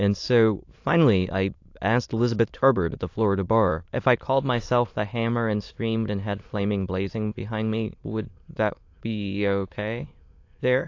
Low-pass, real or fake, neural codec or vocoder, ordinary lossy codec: 7.2 kHz; fake; autoencoder, 22.05 kHz, a latent of 192 numbers a frame, VITS, trained on many speakers; AAC, 48 kbps